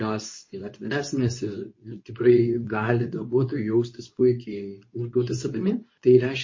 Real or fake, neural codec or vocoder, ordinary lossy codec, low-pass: fake; codec, 24 kHz, 0.9 kbps, WavTokenizer, medium speech release version 2; MP3, 32 kbps; 7.2 kHz